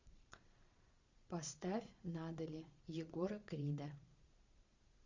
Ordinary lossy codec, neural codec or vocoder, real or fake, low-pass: Opus, 64 kbps; vocoder, 22.05 kHz, 80 mel bands, Vocos; fake; 7.2 kHz